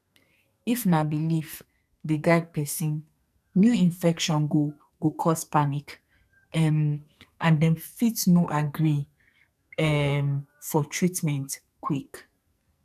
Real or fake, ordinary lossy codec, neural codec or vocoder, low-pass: fake; none; codec, 44.1 kHz, 2.6 kbps, SNAC; 14.4 kHz